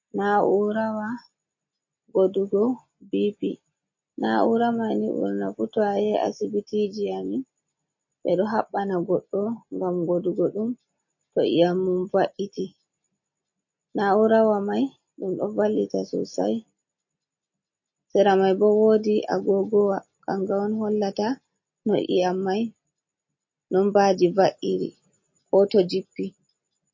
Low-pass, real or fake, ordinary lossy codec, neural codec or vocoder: 7.2 kHz; real; MP3, 32 kbps; none